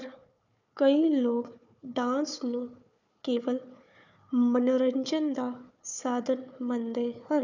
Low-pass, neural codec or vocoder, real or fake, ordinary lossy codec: 7.2 kHz; codec, 16 kHz, 4 kbps, FunCodec, trained on Chinese and English, 50 frames a second; fake; none